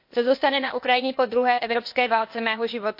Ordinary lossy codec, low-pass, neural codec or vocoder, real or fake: MP3, 32 kbps; 5.4 kHz; codec, 16 kHz, 0.8 kbps, ZipCodec; fake